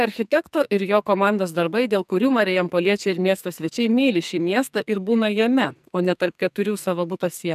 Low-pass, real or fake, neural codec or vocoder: 14.4 kHz; fake; codec, 44.1 kHz, 2.6 kbps, SNAC